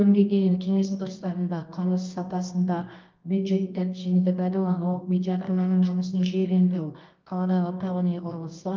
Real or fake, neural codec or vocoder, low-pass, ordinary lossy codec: fake; codec, 24 kHz, 0.9 kbps, WavTokenizer, medium music audio release; 7.2 kHz; Opus, 32 kbps